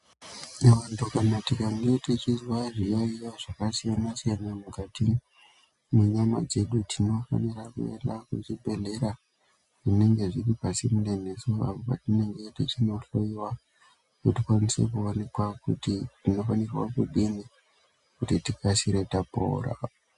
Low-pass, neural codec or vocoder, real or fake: 10.8 kHz; none; real